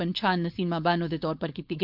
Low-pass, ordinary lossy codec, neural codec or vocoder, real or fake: 5.4 kHz; AAC, 48 kbps; codec, 16 kHz, 4.8 kbps, FACodec; fake